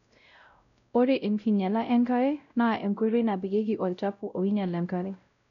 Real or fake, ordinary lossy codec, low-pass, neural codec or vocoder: fake; none; 7.2 kHz; codec, 16 kHz, 0.5 kbps, X-Codec, WavLM features, trained on Multilingual LibriSpeech